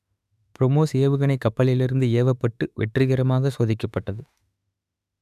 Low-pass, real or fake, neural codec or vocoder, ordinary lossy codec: 14.4 kHz; fake; autoencoder, 48 kHz, 32 numbers a frame, DAC-VAE, trained on Japanese speech; none